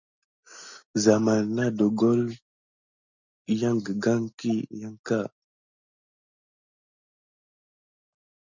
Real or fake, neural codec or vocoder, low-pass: real; none; 7.2 kHz